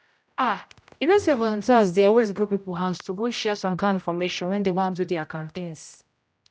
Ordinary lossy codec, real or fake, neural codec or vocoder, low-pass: none; fake; codec, 16 kHz, 0.5 kbps, X-Codec, HuBERT features, trained on general audio; none